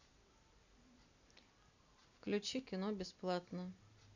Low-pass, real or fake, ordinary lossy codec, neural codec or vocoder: 7.2 kHz; real; none; none